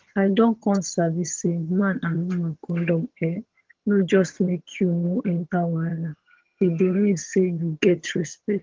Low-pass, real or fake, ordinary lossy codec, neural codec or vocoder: 7.2 kHz; fake; Opus, 16 kbps; vocoder, 22.05 kHz, 80 mel bands, HiFi-GAN